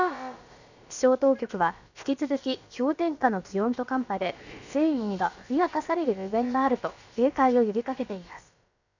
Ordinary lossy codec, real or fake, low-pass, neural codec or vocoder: none; fake; 7.2 kHz; codec, 16 kHz, about 1 kbps, DyCAST, with the encoder's durations